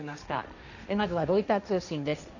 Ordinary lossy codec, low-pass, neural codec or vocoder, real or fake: none; 7.2 kHz; codec, 16 kHz, 1.1 kbps, Voila-Tokenizer; fake